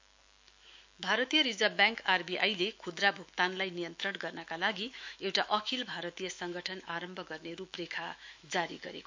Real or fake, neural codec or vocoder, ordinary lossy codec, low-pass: fake; autoencoder, 48 kHz, 128 numbers a frame, DAC-VAE, trained on Japanese speech; MP3, 64 kbps; 7.2 kHz